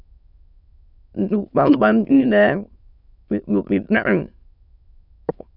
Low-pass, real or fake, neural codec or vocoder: 5.4 kHz; fake; autoencoder, 22.05 kHz, a latent of 192 numbers a frame, VITS, trained on many speakers